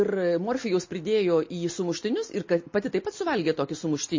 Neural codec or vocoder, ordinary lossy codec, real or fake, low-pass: none; MP3, 32 kbps; real; 7.2 kHz